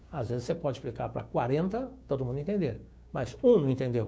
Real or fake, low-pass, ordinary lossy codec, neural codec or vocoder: fake; none; none; codec, 16 kHz, 6 kbps, DAC